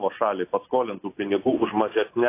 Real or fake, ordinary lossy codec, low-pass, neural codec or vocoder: real; AAC, 24 kbps; 3.6 kHz; none